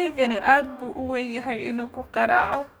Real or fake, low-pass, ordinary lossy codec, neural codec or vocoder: fake; none; none; codec, 44.1 kHz, 2.6 kbps, DAC